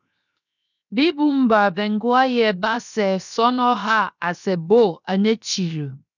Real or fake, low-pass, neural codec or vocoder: fake; 7.2 kHz; codec, 16 kHz, 0.7 kbps, FocalCodec